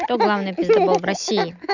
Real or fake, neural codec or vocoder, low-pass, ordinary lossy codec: real; none; 7.2 kHz; none